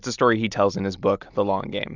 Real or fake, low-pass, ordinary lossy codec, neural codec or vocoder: fake; 7.2 kHz; Opus, 64 kbps; vocoder, 44.1 kHz, 128 mel bands every 256 samples, BigVGAN v2